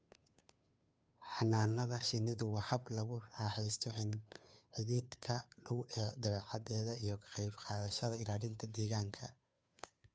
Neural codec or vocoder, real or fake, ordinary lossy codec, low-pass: codec, 16 kHz, 2 kbps, FunCodec, trained on Chinese and English, 25 frames a second; fake; none; none